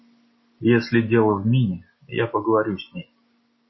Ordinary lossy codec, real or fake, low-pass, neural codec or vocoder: MP3, 24 kbps; real; 7.2 kHz; none